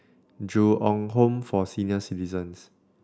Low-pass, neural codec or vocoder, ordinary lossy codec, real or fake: none; none; none; real